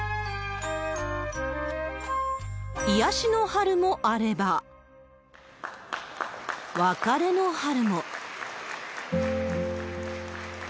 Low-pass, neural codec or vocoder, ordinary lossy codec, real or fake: none; none; none; real